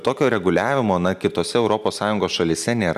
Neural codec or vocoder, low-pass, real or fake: none; 14.4 kHz; real